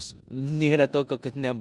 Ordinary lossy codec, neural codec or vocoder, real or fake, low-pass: Opus, 64 kbps; codec, 16 kHz in and 24 kHz out, 0.9 kbps, LongCat-Audio-Codec, four codebook decoder; fake; 10.8 kHz